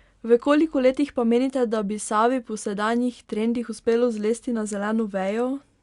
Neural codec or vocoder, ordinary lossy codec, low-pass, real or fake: none; Opus, 64 kbps; 10.8 kHz; real